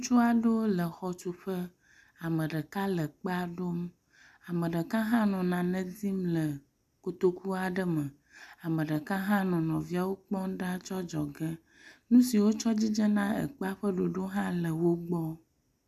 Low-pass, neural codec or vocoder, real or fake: 14.4 kHz; none; real